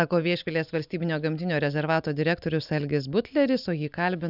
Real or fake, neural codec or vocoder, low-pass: real; none; 5.4 kHz